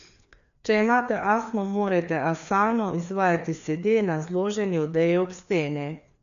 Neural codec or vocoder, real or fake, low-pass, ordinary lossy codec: codec, 16 kHz, 2 kbps, FreqCodec, larger model; fake; 7.2 kHz; none